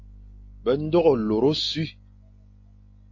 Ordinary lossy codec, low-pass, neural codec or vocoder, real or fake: AAC, 48 kbps; 7.2 kHz; none; real